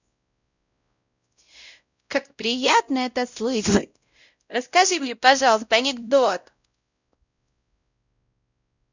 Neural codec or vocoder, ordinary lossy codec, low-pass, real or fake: codec, 16 kHz, 0.5 kbps, X-Codec, WavLM features, trained on Multilingual LibriSpeech; none; 7.2 kHz; fake